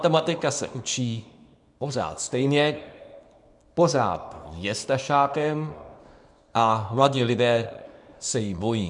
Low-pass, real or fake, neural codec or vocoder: 10.8 kHz; fake; codec, 24 kHz, 0.9 kbps, WavTokenizer, small release